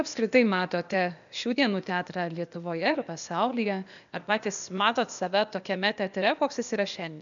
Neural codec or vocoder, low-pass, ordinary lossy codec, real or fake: codec, 16 kHz, 0.8 kbps, ZipCodec; 7.2 kHz; MP3, 96 kbps; fake